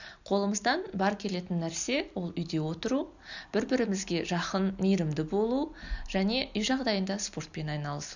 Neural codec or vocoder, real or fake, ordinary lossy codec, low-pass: none; real; none; 7.2 kHz